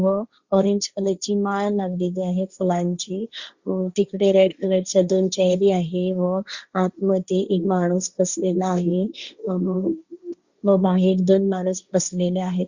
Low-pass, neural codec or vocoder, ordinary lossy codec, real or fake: 7.2 kHz; codec, 16 kHz, 1.1 kbps, Voila-Tokenizer; Opus, 64 kbps; fake